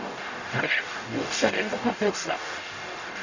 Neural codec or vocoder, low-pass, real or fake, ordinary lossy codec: codec, 44.1 kHz, 0.9 kbps, DAC; 7.2 kHz; fake; none